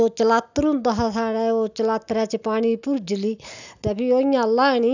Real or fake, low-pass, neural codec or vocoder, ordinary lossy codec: real; 7.2 kHz; none; none